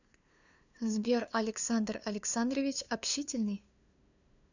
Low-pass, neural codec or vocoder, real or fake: 7.2 kHz; codec, 16 kHz, 2 kbps, FunCodec, trained on LibriTTS, 25 frames a second; fake